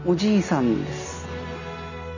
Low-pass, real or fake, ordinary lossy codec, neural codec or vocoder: 7.2 kHz; real; none; none